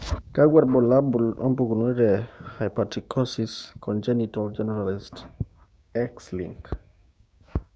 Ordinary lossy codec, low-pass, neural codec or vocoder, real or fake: none; none; codec, 16 kHz, 6 kbps, DAC; fake